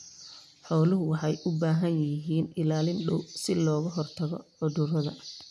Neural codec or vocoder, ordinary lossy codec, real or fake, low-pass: none; none; real; none